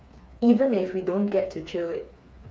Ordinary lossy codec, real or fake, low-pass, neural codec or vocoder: none; fake; none; codec, 16 kHz, 4 kbps, FreqCodec, smaller model